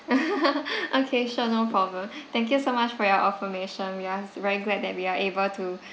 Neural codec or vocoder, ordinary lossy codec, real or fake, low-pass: none; none; real; none